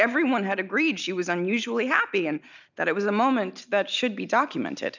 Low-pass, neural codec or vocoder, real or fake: 7.2 kHz; none; real